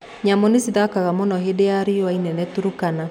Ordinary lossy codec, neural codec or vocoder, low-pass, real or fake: none; none; 19.8 kHz; real